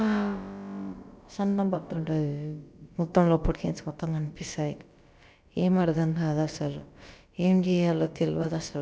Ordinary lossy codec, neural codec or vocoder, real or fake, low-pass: none; codec, 16 kHz, about 1 kbps, DyCAST, with the encoder's durations; fake; none